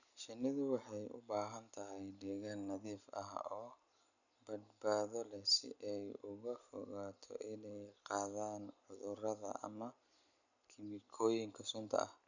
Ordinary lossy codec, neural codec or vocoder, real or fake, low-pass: none; none; real; 7.2 kHz